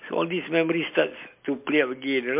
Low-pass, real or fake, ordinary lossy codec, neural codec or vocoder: 3.6 kHz; real; MP3, 32 kbps; none